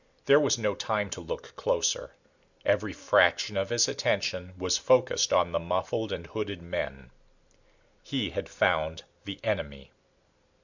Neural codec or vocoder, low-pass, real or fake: none; 7.2 kHz; real